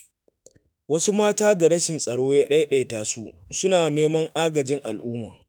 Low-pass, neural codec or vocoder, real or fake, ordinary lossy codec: none; autoencoder, 48 kHz, 32 numbers a frame, DAC-VAE, trained on Japanese speech; fake; none